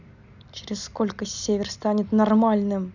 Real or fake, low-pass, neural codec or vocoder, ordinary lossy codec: real; 7.2 kHz; none; none